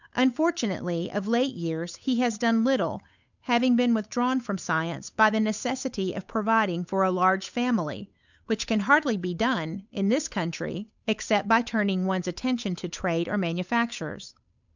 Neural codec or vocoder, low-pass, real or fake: codec, 16 kHz, 8 kbps, FunCodec, trained on Chinese and English, 25 frames a second; 7.2 kHz; fake